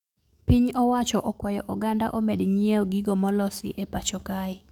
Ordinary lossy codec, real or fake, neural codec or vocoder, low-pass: none; fake; codec, 44.1 kHz, 7.8 kbps, DAC; 19.8 kHz